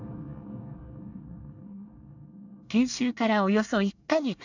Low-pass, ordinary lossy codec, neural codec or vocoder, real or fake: 7.2 kHz; none; codec, 24 kHz, 1 kbps, SNAC; fake